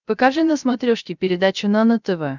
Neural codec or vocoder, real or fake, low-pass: codec, 16 kHz, 0.3 kbps, FocalCodec; fake; 7.2 kHz